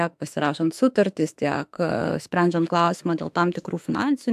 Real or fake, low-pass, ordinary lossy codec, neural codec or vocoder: fake; 14.4 kHz; AAC, 96 kbps; autoencoder, 48 kHz, 32 numbers a frame, DAC-VAE, trained on Japanese speech